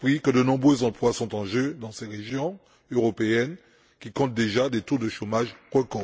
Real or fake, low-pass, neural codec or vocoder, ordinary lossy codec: real; none; none; none